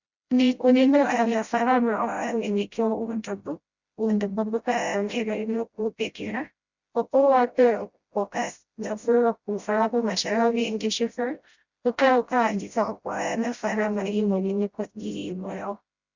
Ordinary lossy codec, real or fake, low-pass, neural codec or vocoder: Opus, 64 kbps; fake; 7.2 kHz; codec, 16 kHz, 0.5 kbps, FreqCodec, smaller model